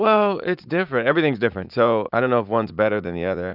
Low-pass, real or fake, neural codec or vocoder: 5.4 kHz; fake; vocoder, 44.1 kHz, 80 mel bands, Vocos